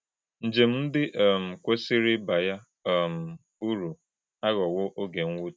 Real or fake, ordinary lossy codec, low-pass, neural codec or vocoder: real; none; none; none